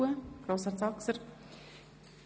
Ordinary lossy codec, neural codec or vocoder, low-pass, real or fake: none; none; none; real